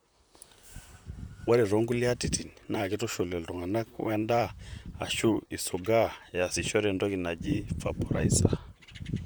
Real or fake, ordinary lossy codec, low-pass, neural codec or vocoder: fake; none; none; vocoder, 44.1 kHz, 128 mel bands, Pupu-Vocoder